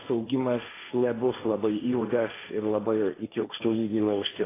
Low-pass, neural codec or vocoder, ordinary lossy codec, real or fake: 3.6 kHz; codec, 16 kHz, 1.1 kbps, Voila-Tokenizer; AAC, 16 kbps; fake